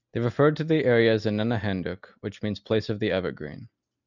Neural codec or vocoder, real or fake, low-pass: none; real; 7.2 kHz